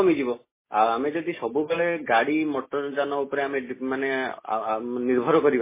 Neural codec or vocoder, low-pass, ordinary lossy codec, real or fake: none; 3.6 kHz; MP3, 16 kbps; real